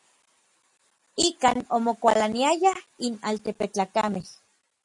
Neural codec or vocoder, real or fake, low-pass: none; real; 10.8 kHz